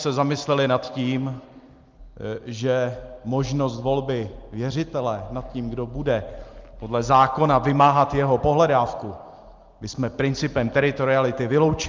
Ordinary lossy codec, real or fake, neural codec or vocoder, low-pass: Opus, 32 kbps; real; none; 7.2 kHz